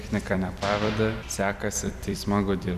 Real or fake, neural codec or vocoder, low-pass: fake; vocoder, 44.1 kHz, 128 mel bands every 256 samples, BigVGAN v2; 14.4 kHz